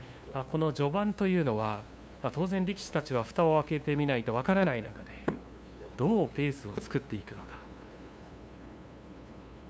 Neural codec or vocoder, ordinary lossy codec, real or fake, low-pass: codec, 16 kHz, 2 kbps, FunCodec, trained on LibriTTS, 25 frames a second; none; fake; none